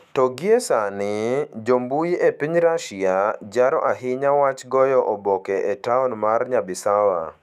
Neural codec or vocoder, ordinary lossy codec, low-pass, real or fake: autoencoder, 48 kHz, 128 numbers a frame, DAC-VAE, trained on Japanese speech; none; 14.4 kHz; fake